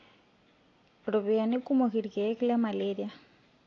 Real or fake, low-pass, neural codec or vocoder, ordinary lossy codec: real; 7.2 kHz; none; AAC, 32 kbps